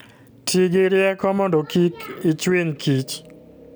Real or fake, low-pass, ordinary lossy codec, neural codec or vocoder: real; none; none; none